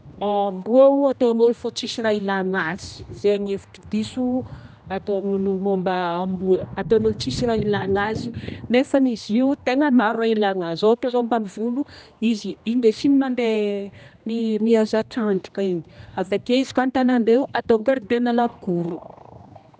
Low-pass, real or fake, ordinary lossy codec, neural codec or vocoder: none; fake; none; codec, 16 kHz, 1 kbps, X-Codec, HuBERT features, trained on general audio